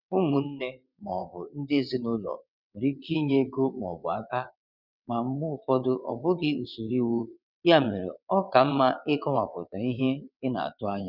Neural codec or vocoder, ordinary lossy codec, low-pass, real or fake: vocoder, 22.05 kHz, 80 mel bands, WaveNeXt; none; 5.4 kHz; fake